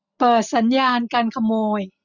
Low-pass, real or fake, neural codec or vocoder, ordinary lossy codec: 7.2 kHz; real; none; none